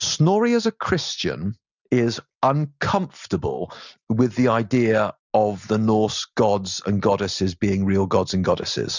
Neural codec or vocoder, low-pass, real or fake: none; 7.2 kHz; real